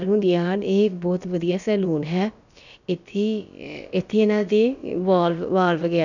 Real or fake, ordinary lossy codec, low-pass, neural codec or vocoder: fake; none; 7.2 kHz; codec, 16 kHz, about 1 kbps, DyCAST, with the encoder's durations